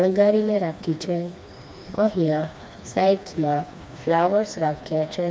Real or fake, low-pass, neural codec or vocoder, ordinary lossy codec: fake; none; codec, 16 kHz, 2 kbps, FreqCodec, smaller model; none